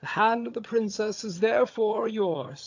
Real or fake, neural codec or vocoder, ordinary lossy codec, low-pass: fake; vocoder, 22.05 kHz, 80 mel bands, HiFi-GAN; AAC, 48 kbps; 7.2 kHz